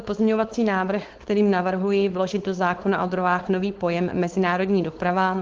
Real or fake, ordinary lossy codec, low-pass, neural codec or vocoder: fake; Opus, 16 kbps; 7.2 kHz; codec, 16 kHz, 4.8 kbps, FACodec